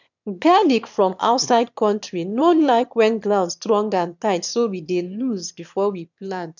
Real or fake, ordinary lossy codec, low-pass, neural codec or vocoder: fake; none; 7.2 kHz; autoencoder, 22.05 kHz, a latent of 192 numbers a frame, VITS, trained on one speaker